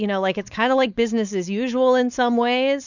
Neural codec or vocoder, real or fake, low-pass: codec, 16 kHz, 4.8 kbps, FACodec; fake; 7.2 kHz